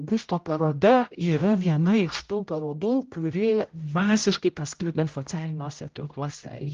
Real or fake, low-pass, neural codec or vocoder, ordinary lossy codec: fake; 7.2 kHz; codec, 16 kHz, 0.5 kbps, X-Codec, HuBERT features, trained on general audio; Opus, 32 kbps